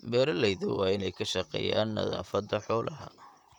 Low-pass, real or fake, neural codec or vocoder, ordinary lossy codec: 19.8 kHz; fake; vocoder, 44.1 kHz, 128 mel bands, Pupu-Vocoder; none